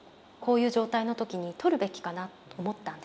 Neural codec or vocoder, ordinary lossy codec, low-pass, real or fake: none; none; none; real